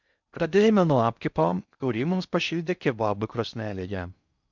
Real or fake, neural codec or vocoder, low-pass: fake; codec, 16 kHz in and 24 kHz out, 0.6 kbps, FocalCodec, streaming, 4096 codes; 7.2 kHz